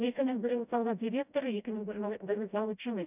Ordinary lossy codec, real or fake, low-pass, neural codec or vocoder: none; fake; 3.6 kHz; codec, 16 kHz, 0.5 kbps, FreqCodec, smaller model